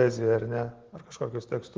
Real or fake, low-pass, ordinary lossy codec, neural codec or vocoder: real; 7.2 kHz; Opus, 16 kbps; none